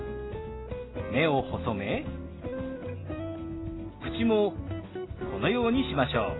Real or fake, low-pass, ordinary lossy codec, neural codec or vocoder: real; 7.2 kHz; AAC, 16 kbps; none